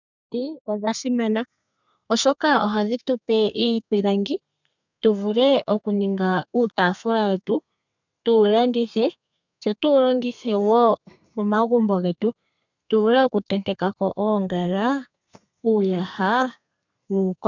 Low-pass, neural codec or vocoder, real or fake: 7.2 kHz; codec, 32 kHz, 1.9 kbps, SNAC; fake